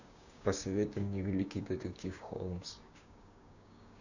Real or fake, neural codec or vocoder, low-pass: fake; codec, 16 kHz, 6 kbps, DAC; 7.2 kHz